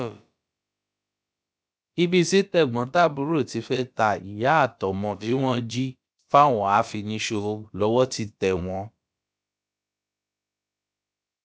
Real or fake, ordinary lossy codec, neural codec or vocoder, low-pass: fake; none; codec, 16 kHz, about 1 kbps, DyCAST, with the encoder's durations; none